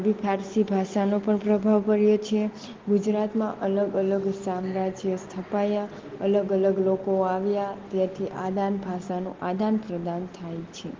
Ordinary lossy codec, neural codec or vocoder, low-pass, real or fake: Opus, 16 kbps; none; 7.2 kHz; real